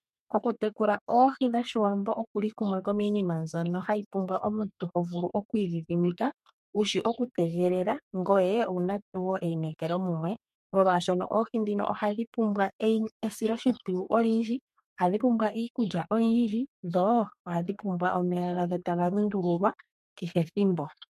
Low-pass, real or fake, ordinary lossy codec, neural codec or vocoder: 14.4 kHz; fake; MP3, 64 kbps; codec, 32 kHz, 1.9 kbps, SNAC